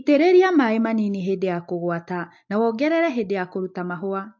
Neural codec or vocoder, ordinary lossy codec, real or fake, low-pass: none; MP3, 48 kbps; real; 7.2 kHz